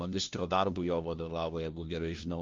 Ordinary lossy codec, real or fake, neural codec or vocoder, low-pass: Opus, 32 kbps; fake; codec, 16 kHz, 1 kbps, FunCodec, trained on LibriTTS, 50 frames a second; 7.2 kHz